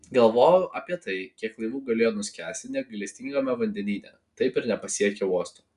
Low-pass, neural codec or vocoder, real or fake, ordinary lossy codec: 10.8 kHz; none; real; Opus, 64 kbps